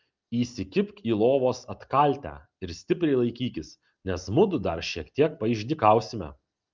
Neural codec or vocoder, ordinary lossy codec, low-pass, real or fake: none; Opus, 24 kbps; 7.2 kHz; real